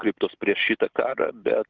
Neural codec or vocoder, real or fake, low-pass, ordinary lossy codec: none; real; 7.2 kHz; Opus, 32 kbps